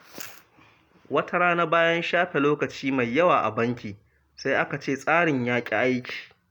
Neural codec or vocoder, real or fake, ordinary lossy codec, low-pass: none; real; none; 19.8 kHz